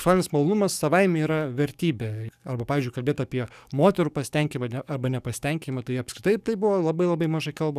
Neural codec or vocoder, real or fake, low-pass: codec, 44.1 kHz, 7.8 kbps, DAC; fake; 14.4 kHz